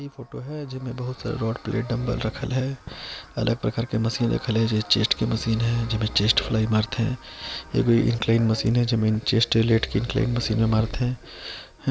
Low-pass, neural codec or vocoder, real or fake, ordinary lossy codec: none; none; real; none